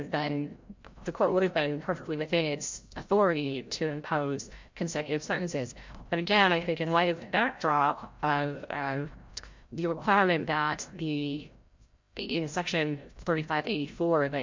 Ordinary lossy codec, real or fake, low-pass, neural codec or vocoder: MP3, 48 kbps; fake; 7.2 kHz; codec, 16 kHz, 0.5 kbps, FreqCodec, larger model